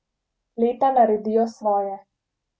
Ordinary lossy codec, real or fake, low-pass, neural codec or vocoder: none; real; none; none